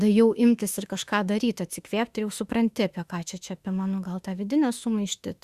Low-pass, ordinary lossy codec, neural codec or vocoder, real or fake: 14.4 kHz; Opus, 64 kbps; autoencoder, 48 kHz, 32 numbers a frame, DAC-VAE, trained on Japanese speech; fake